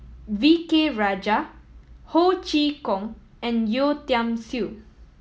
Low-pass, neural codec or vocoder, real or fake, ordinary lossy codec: none; none; real; none